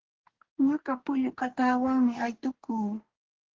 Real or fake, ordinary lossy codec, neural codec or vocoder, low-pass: fake; Opus, 16 kbps; codec, 44.1 kHz, 2.6 kbps, DAC; 7.2 kHz